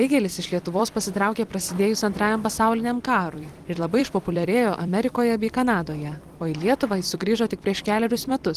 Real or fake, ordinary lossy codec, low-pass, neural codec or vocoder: fake; Opus, 24 kbps; 14.4 kHz; vocoder, 44.1 kHz, 128 mel bands every 256 samples, BigVGAN v2